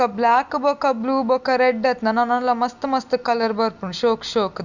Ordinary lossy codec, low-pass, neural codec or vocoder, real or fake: none; 7.2 kHz; none; real